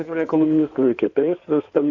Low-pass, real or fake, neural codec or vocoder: 7.2 kHz; fake; codec, 16 kHz in and 24 kHz out, 2.2 kbps, FireRedTTS-2 codec